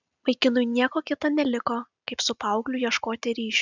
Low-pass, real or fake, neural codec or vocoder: 7.2 kHz; real; none